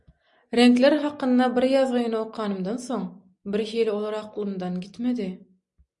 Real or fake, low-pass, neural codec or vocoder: real; 10.8 kHz; none